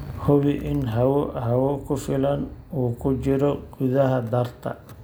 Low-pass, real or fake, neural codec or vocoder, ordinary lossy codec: none; real; none; none